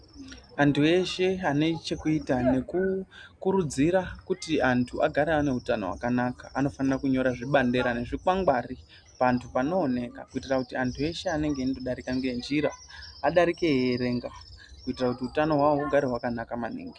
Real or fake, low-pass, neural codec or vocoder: real; 9.9 kHz; none